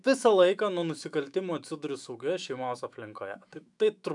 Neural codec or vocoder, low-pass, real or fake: autoencoder, 48 kHz, 128 numbers a frame, DAC-VAE, trained on Japanese speech; 10.8 kHz; fake